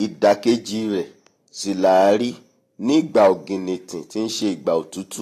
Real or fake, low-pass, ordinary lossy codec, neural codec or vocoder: real; 14.4 kHz; AAC, 48 kbps; none